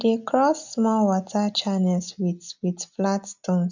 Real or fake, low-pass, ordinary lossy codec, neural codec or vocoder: real; 7.2 kHz; none; none